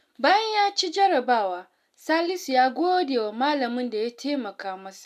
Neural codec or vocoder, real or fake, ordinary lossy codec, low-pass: none; real; none; 14.4 kHz